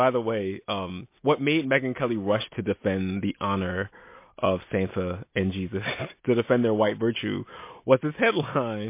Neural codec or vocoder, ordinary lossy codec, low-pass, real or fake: none; MP3, 24 kbps; 3.6 kHz; real